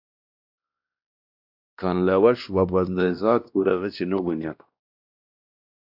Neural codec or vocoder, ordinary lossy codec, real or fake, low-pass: codec, 16 kHz, 1 kbps, X-Codec, WavLM features, trained on Multilingual LibriSpeech; AAC, 48 kbps; fake; 5.4 kHz